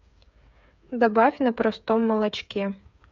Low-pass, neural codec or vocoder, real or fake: 7.2 kHz; codec, 16 kHz, 8 kbps, FreqCodec, smaller model; fake